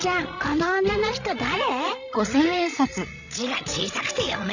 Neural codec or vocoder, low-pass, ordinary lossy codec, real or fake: codec, 16 kHz, 16 kbps, FreqCodec, larger model; 7.2 kHz; none; fake